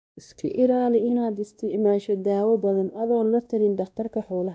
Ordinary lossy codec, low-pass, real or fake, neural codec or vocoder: none; none; fake; codec, 16 kHz, 1 kbps, X-Codec, WavLM features, trained on Multilingual LibriSpeech